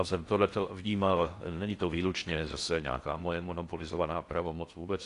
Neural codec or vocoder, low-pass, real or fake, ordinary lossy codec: codec, 16 kHz in and 24 kHz out, 0.6 kbps, FocalCodec, streaming, 4096 codes; 10.8 kHz; fake; AAC, 48 kbps